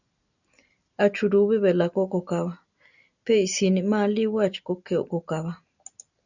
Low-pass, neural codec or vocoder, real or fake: 7.2 kHz; none; real